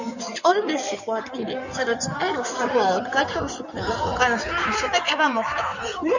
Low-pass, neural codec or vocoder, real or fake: 7.2 kHz; codec, 16 kHz in and 24 kHz out, 2.2 kbps, FireRedTTS-2 codec; fake